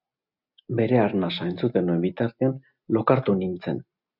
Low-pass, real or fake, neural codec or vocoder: 5.4 kHz; real; none